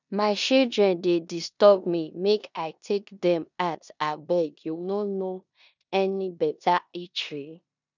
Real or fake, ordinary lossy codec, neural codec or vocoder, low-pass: fake; none; codec, 16 kHz in and 24 kHz out, 0.9 kbps, LongCat-Audio-Codec, four codebook decoder; 7.2 kHz